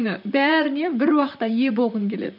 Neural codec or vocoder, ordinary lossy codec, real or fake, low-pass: vocoder, 44.1 kHz, 128 mel bands, Pupu-Vocoder; none; fake; 5.4 kHz